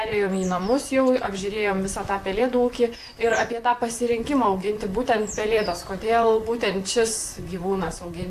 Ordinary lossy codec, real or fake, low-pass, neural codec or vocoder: AAC, 64 kbps; fake; 14.4 kHz; vocoder, 44.1 kHz, 128 mel bands, Pupu-Vocoder